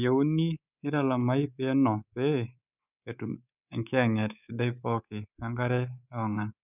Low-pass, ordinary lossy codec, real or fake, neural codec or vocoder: 3.6 kHz; none; fake; codec, 24 kHz, 3.1 kbps, DualCodec